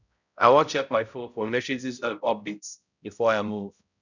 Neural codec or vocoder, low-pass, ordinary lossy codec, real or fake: codec, 16 kHz, 0.5 kbps, X-Codec, HuBERT features, trained on balanced general audio; 7.2 kHz; none; fake